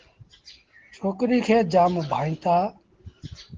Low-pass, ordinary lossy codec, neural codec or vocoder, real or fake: 7.2 kHz; Opus, 16 kbps; none; real